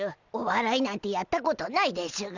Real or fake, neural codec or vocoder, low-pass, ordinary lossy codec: real; none; 7.2 kHz; none